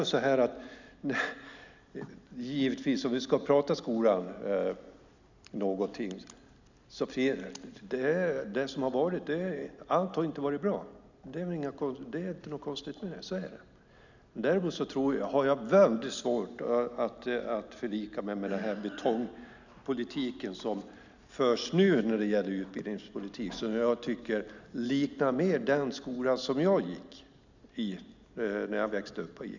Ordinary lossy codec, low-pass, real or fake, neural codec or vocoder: none; 7.2 kHz; real; none